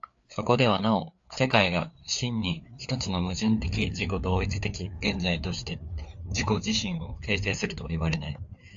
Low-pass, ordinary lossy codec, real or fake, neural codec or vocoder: 7.2 kHz; AAC, 64 kbps; fake; codec, 16 kHz, 4 kbps, FreqCodec, larger model